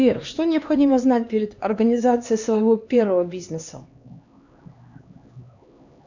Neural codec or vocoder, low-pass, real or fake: codec, 16 kHz, 2 kbps, X-Codec, HuBERT features, trained on LibriSpeech; 7.2 kHz; fake